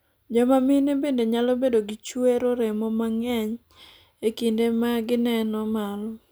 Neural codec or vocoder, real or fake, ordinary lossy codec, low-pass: none; real; none; none